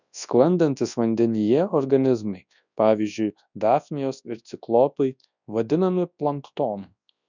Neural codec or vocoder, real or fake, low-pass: codec, 24 kHz, 0.9 kbps, WavTokenizer, large speech release; fake; 7.2 kHz